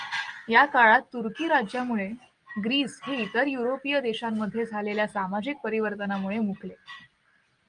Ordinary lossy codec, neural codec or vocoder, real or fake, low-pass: Opus, 32 kbps; none; real; 9.9 kHz